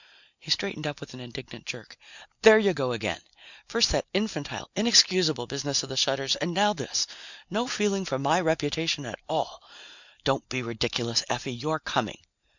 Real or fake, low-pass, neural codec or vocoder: real; 7.2 kHz; none